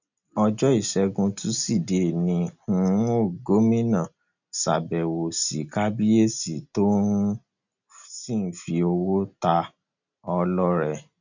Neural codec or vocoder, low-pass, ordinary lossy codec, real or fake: none; 7.2 kHz; none; real